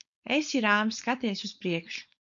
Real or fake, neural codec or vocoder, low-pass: fake; codec, 16 kHz, 4.8 kbps, FACodec; 7.2 kHz